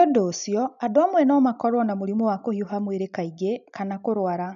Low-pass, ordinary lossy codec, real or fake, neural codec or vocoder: 7.2 kHz; none; real; none